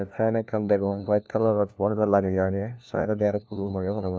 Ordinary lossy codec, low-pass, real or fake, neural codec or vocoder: none; none; fake; codec, 16 kHz, 1 kbps, FunCodec, trained on LibriTTS, 50 frames a second